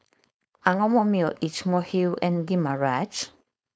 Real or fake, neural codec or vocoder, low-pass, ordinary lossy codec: fake; codec, 16 kHz, 4.8 kbps, FACodec; none; none